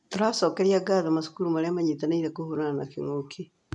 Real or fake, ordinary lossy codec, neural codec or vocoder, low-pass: real; none; none; 10.8 kHz